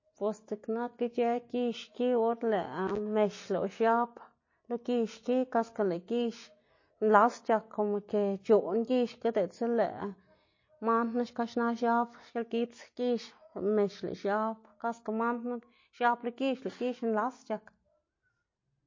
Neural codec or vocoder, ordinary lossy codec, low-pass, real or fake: none; MP3, 32 kbps; 7.2 kHz; real